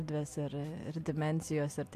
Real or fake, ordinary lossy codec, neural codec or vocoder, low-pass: real; AAC, 64 kbps; none; 14.4 kHz